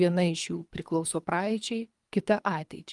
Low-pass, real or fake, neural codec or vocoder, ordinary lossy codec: 10.8 kHz; fake; codec, 24 kHz, 3 kbps, HILCodec; Opus, 32 kbps